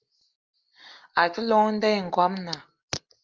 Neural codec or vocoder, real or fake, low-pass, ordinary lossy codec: none; real; 7.2 kHz; Opus, 32 kbps